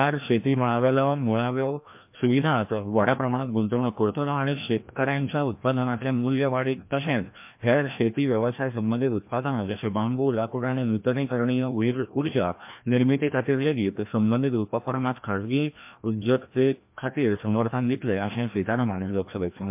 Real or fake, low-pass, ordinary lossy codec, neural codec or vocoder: fake; 3.6 kHz; MP3, 32 kbps; codec, 16 kHz, 1 kbps, FreqCodec, larger model